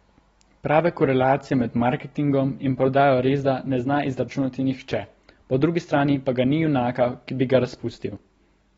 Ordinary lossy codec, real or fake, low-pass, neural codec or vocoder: AAC, 24 kbps; real; 10.8 kHz; none